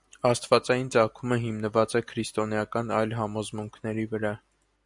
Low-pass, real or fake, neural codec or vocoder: 10.8 kHz; real; none